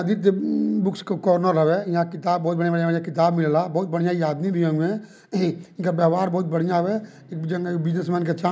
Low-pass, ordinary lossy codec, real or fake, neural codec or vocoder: none; none; real; none